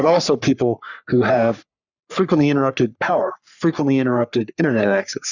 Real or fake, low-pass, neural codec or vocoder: fake; 7.2 kHz; codec, 44.1 kHz, 3.4 kbps, Pupu-Codec